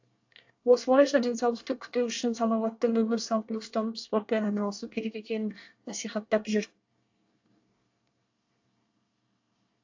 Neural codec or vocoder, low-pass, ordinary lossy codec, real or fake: codec, 24 kHz, 1 kbps, SNAC; 7.2 kHz; AAC, 48 kbps; fake